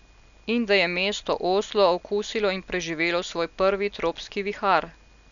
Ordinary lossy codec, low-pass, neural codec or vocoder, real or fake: none; 7.2 kHz; none; real